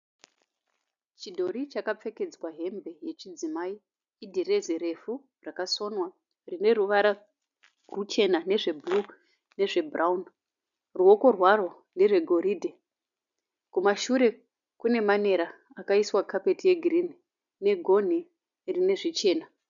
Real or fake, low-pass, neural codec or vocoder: real; 7.2 kHz; none